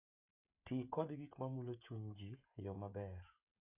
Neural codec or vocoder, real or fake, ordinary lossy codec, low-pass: codec, 16 kHz, 16 kbps, FreqCodec, smaller model; fake; Opus, 64 kbps; 3.6 kHz